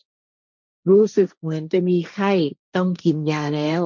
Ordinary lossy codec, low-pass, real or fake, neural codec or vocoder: none; 7.2 kHz; fake; codec, 16 kHz, 1.1 kbps, Voila-Tokenizer